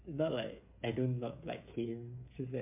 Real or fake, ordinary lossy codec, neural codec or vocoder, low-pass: fake; MP3, 32 kbps; codec, 16 kHz, 16 kbps, FreqCodec, smaller model; 3.6 kHz